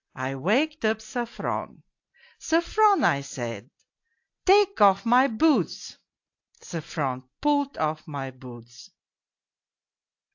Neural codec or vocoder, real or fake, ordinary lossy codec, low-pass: none; real; AAC, 48 kbps; 7.2 kHz